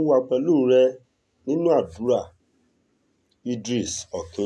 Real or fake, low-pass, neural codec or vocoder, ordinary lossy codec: real; none; none; none